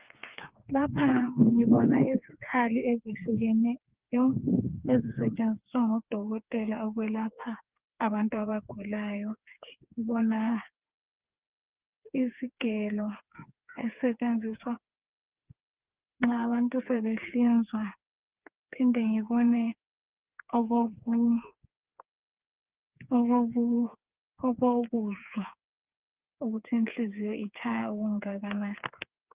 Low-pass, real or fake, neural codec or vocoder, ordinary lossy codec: 3.6 kHz; fake; codec, 16 kHz, 4 kbps, FreqCodec, smaller model; Opus, 32 kbps